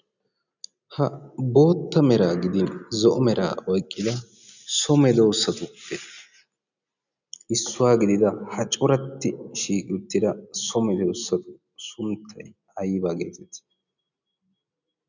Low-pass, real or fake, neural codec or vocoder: 7.2 kHz; real; none